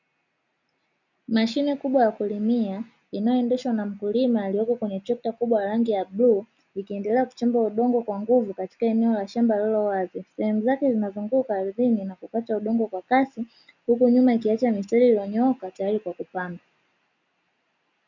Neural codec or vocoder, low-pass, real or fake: none; 7.2 kHz; real